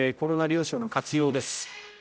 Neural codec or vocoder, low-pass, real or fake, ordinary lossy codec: codec, 16 kHz, 0.5 kbps, X-Codec, HuBERT features, trained on general audio; none; fake; none